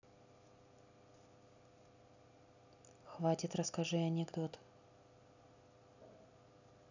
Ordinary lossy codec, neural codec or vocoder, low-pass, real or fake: none; none; 7.2 kHz; real